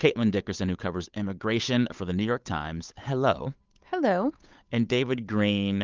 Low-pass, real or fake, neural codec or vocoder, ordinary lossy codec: 7.2 kHz; fake; codec, 16 kHz, 8 kbps, FunCodec, trained on Chinese and English, 25 frames a second; Opus, 24 kbps